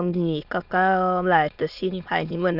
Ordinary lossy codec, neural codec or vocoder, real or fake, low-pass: none; autoencoder, 22.05 kHz, a latent of 192 numbers a frame, VITS, trained on many speakers; fake; 5.4 kHz